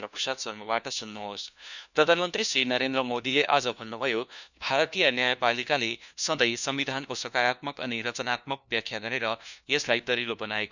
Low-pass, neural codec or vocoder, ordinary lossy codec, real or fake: 7.2 kHz; codec, 16 kHz, 1 kbps, FunCodec, trained on LibriTTS, 50 frames a second; none; fake